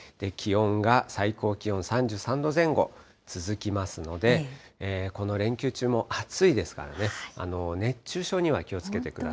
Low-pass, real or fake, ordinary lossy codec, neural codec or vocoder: none; real; none; none